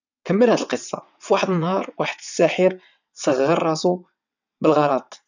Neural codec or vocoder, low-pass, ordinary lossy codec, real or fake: vocoder, 22.05 kHz, 80 mel bands, WaveNeXt; 7.2 kHz; none; fake